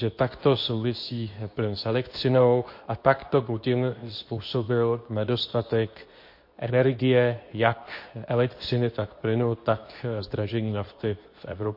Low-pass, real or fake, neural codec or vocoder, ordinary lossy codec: 5.4 kHz; fake; codec, 24 kHz, 0.9 kbps, WavTokenizer, medium speech release version 2; MP3, 32 kbps